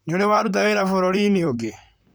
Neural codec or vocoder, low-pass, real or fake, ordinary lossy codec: vocoder, 44.1 kHz, 128 mel bands, Pupu-Vocoder; none; fake; none